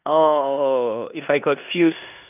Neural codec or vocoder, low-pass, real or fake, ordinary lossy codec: codec, 16 kHz in and 24 kHz out, 0.9 kbps, LongCat-Audio-Codec, four codebook decoder; 3.6 kHz; fake; none